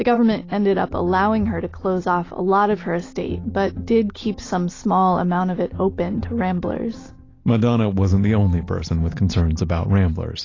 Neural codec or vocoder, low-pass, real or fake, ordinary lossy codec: none; 7.2 kHz; real; AAC, 32 kbps